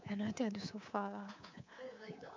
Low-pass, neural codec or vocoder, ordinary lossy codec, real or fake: 7.2 kHz; codec, 24 kHz, 3.1 kbps, DualCodec; MP3, 64 kbps; fake